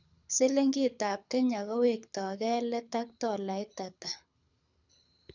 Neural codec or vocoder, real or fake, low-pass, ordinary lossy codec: codec, 24 kHz, 6 kbps, HILCodec; fake; 7.2 kHz; none